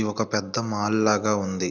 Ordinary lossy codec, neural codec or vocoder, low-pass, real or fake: none; none; 7.2 kHz; real